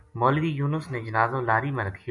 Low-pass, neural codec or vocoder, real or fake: 10.8 kHz; none; real